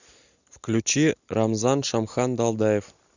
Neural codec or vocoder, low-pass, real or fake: none; 7.2 kHz; real